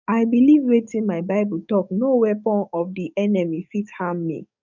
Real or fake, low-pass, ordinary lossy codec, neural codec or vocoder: fake; 7.2 kHz; none; codec, 44.1 kHz, 7.8 kbps, DAC